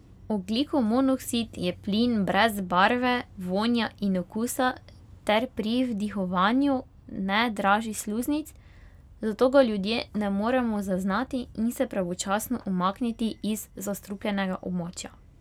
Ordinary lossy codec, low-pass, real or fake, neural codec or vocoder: none; 19.8 kHz; real; none